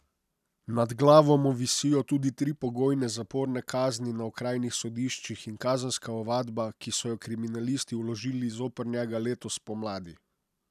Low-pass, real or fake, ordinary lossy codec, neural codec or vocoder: 14.4 kHz; real; none; none